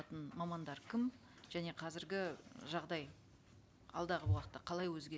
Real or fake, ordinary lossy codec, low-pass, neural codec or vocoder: real; none; none; none